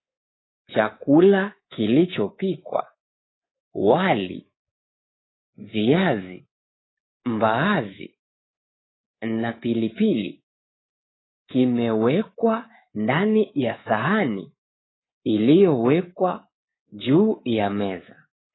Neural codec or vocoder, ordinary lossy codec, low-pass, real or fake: codec, 24 kHz, 3.1 kbps, DualCodec; AAC, 16 kbps; 7.2 kHz; fake